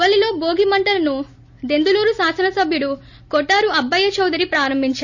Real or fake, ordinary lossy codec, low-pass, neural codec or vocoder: real; none; 7.2 kHz; none